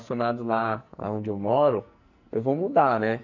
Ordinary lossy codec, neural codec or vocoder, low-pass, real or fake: none; codec, 44.1 kHz, 2.6 kbps, SNAC; 7.2 kHz; fake